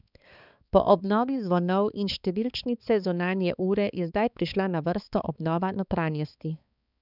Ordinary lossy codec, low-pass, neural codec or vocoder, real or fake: none; 5.4 kHz; codec, 16 kHz, 4 kbps, X-Codec, HuBERT features, trained on balanced general audio; fake